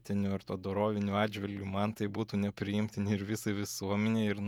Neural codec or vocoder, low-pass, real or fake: vocoder, 48 kHz, 128 mel bands, Vocos; 19.8 kHz; fake